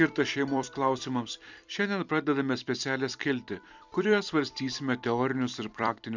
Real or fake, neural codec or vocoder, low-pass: fake; vocoder, 44.1 kHz, 128 mel bands every 256 samples, BigVGAN v2; 7.2 kHz